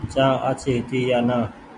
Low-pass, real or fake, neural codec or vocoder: 10.8 kHz; real; none